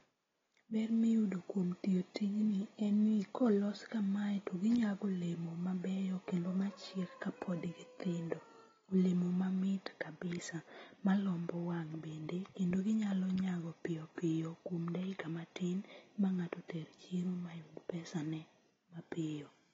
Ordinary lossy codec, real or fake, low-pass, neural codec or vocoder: AAC, 24 kbps; real; 7.2 kHz; none